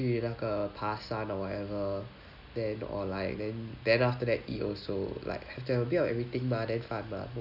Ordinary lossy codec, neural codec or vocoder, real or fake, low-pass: none; none; real; 5.4 kHz